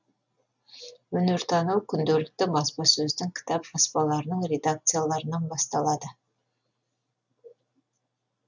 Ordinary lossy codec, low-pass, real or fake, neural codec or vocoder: none; 7.2 kHz; real; none